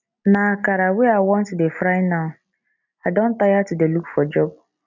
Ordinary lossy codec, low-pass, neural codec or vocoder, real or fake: none; 7.2 kHz; none; real